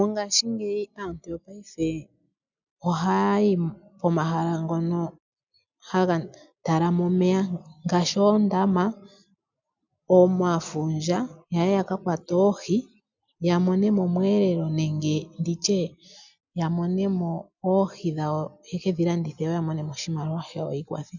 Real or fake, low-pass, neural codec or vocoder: real; 7.2 kHz; none